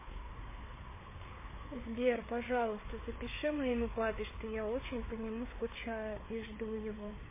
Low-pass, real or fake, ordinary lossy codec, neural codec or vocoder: 3.6 kHz; fake; MP3, 16 kbps; codec, 16 kHz, 4 kbps, FreqCodec, larger model